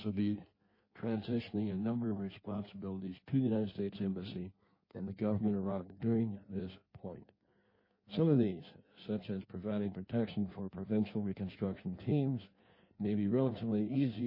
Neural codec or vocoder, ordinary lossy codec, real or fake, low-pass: codec, 16 kHz in and 24 kHz out, 1.1 kbps, FireRedTTS-2 codec; MP3, 24 kbps; fake; 5.4 kHz